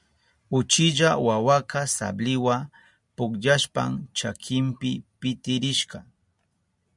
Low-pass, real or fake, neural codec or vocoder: 10.8 kHz; real; none